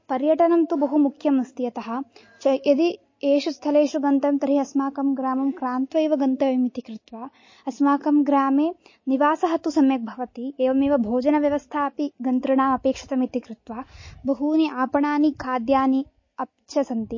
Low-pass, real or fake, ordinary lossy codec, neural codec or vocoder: 7.2 kHz; real; MP3, 32 kbps; none